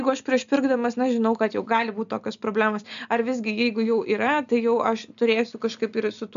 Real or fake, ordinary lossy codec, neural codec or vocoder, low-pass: real; AAC, 96 kbps; none; 7.2 kHz